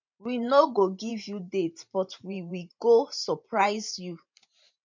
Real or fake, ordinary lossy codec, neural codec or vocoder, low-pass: fake; MP3, 48 kbps; vocoder, 44.1 kHz, 128 mel bands every 512 samples, BigVGAN v2; 7.2 kHz